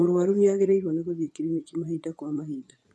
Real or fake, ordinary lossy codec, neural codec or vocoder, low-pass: fake; none; vocoder, 24 kHz, 100 mel bands, Vocos; none